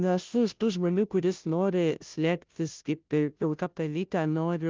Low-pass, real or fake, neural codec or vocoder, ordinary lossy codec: 7.2 kHz; fake; codec, 16 kHz, 0.5 kbps, FunCodec, trained on Chinese and English, 25 frames a second; Opus, 32 kbps